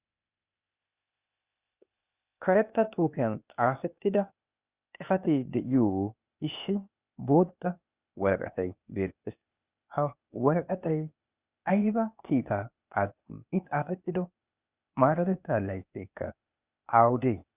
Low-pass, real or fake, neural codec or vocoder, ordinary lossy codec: 3.6 kHz; fake; codec, 16 kHz, 0.8 kbps, ZipCodec; Opus, 64 kbps